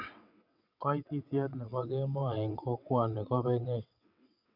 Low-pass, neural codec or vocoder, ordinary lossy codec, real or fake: 5.4 kHz; vocoder, 22.05 kHz, 80 mel bands, WaveNeXt; none; fake